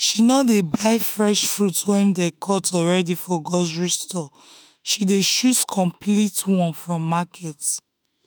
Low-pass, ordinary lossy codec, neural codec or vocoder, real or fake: none; none; autoencoder, 48 kHz, 32 numbers a frame, DAC-VAE, trained on Japanese speech; fake